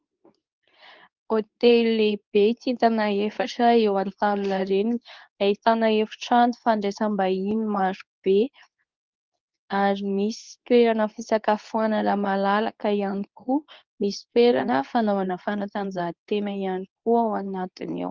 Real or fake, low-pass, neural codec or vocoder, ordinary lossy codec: fake; 7.2 kHz; codec, 24 kHz, 0.9 kbps, WavTokenizer, medium speech release version 2; Opus, 24 kbps